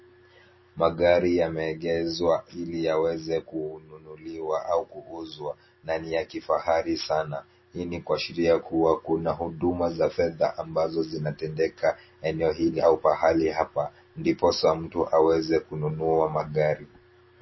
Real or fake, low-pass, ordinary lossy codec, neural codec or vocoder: real; 7.2 kHz; MP3, 24 kbps; none